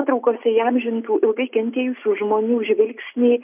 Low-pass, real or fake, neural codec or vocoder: 3.6 kHz; real; none